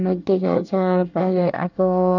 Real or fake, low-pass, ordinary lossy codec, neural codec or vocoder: fake; 7.2 kHz; none; codec, 24 kHz, 1 kbps, SNAC